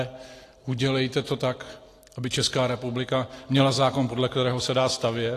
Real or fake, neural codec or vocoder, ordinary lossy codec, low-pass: real; none; AAC, 48 kbps; 14.4 kHz